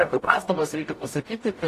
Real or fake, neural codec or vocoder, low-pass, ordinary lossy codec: fake; codec, 44.1 kHz, 0.9 kbps, DAC; 14.4 kHz; AAC, 48 kbps